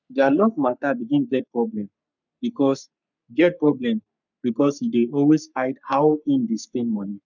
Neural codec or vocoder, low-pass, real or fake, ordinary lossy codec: codec, 44.1 kHz, 3.4 kbps, Pupu-Codec; 7.2 kHz; fake; none